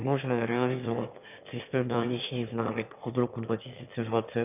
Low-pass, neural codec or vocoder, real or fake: 3.6 kHz; autoencoder, 22.05 kHz, a latent of 192 numbers a frame, VITS, trained on one speaker; fake